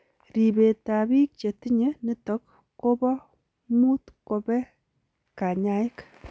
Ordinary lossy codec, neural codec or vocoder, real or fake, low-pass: none; none; real; none